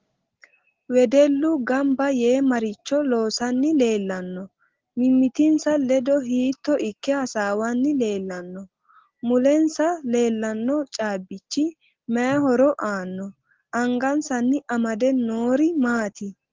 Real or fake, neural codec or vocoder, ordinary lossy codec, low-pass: real; none; Opus, 16 kbps; 7.2 kHz